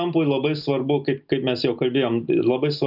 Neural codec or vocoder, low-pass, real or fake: none; 5.4 kHz; real